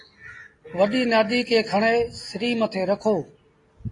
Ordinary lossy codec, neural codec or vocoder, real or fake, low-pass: AAC, 32 kbps; none; real; 10.8 kHz